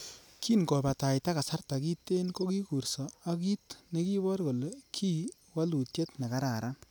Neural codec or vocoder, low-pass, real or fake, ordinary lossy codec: none; none; real; none